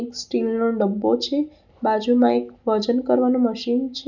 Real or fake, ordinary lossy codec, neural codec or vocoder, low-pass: real; none; none; 7.2 kHz